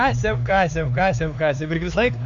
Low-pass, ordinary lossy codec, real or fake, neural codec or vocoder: 7.2 kHz; MP3, 64 kbps; fake; codec, 16 kHz, 4 kbps, X-Codec, HuBERT features, trained on LibriSpeech